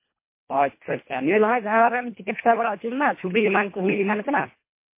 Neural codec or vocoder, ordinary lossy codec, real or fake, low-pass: codec, 24 kHz, 1.5 kbps, HILCodec; MP3, 24 kbps; fake; 3.6 kHz